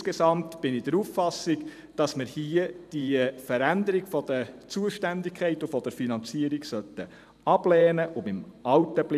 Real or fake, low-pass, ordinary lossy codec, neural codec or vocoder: fake; 14.4 kHz; none; vocoder, 44.1 kHz, 128 mel bands every 512 samples, BigVGAN v2